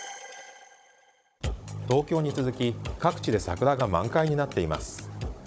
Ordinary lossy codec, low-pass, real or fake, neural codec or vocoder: none; none; fake; codec, 16 kHz, 16 kbps, FunCodec, trained on Chinese and English, 50 frames a second